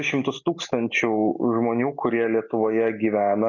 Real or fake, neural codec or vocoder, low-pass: real; none; 7.2 kHz